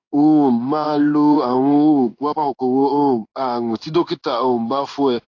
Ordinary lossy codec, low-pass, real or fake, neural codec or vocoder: AAC, 48 kbps; 7.2 kHz; fake; codec, 16 kHz in and 24 kHz out, 1 kbps, XY-Tokenizer